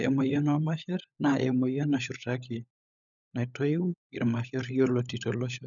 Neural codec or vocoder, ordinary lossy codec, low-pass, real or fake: codec, 16 kHz, 16 kbps, FunCodec, trained on LibriTTS, 50 frames a second; none; 7.2 kHz; fake